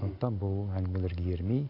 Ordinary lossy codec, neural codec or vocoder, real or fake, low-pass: none; none; real; 5.4 kHz